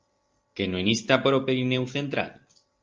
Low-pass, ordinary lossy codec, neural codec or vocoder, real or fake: 7.2 kHz; Opus, 24 kbps; none; real